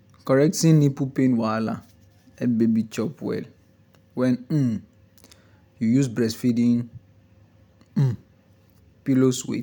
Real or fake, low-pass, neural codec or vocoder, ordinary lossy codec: real; 19.8 kHz; none; none